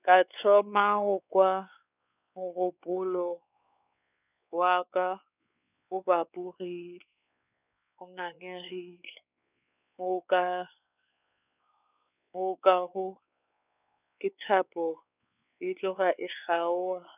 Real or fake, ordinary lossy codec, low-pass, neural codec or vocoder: fake; none; 3.6 kHz; codec, 16 kHz, 4 kbps, X-Codec, WavLM features, trained on Multilingual LibriSpeech